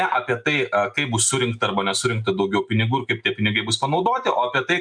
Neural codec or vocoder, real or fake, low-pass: none; real; 9.9 kHz